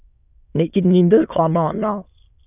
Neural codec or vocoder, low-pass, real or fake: autoencoder, 22.05 kHz, a latent of 192 numbers a frame, VITS, trained on many speakers; 3.6 kHz; fake